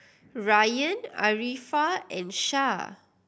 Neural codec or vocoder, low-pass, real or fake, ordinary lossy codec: none; none; real; none